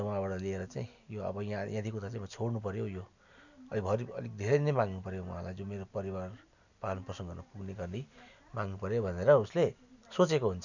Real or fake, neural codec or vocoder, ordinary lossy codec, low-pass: real; none; none; 7.2 kHz